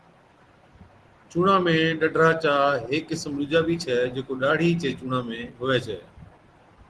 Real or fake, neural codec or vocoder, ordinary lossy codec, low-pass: real; none; Opus, 16 kbps; 10.8 kHz